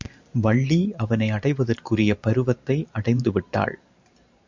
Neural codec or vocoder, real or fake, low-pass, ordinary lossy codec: vocoder, 44.1 kHz, 128 mel bands every 512 samples, BigVGAN v2; fake; 7.2 kHz; MP3, 64 kbps